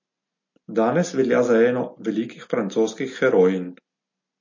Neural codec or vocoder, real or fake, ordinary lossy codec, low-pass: none; real; MP3, 32 kbps; 7.2 kHz